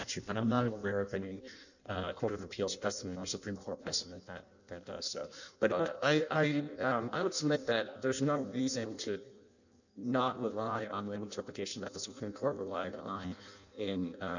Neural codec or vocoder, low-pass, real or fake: codec, 16 kHz in and 24 kHz out, 0.6 kbps, FireRedTTS-2 codec; 7.2 kHz; fake